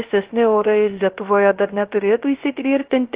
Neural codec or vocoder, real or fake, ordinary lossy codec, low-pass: codec, 16 kHz, 0.3 kbps, FocalCodec; fake; Opus, 16 kbps; 3.6 kHz